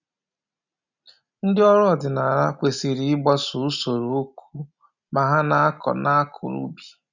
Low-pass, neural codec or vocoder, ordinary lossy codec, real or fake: 7.2 kHz; none; none; real